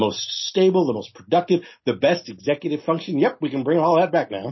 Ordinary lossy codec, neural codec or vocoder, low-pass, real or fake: MP3, 24 kbps; none; 7.2 kHz; real